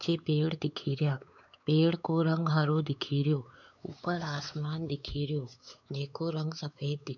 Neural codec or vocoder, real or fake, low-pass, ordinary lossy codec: codec, 16 kHz, 4 kbps, X-Codec, WavLM features, trained on Multilingual LibriSpeech; fake; 7.2 kHz; none